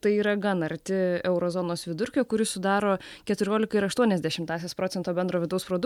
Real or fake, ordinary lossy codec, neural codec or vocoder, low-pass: real; MP3, 96 kbps; none; 19.8 kHz